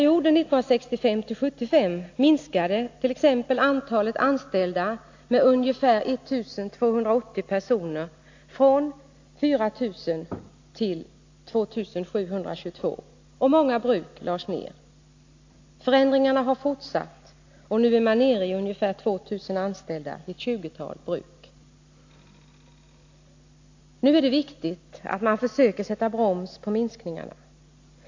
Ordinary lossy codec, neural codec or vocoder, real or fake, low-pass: AAC, 48 kbps; none; real; 7.2 kHz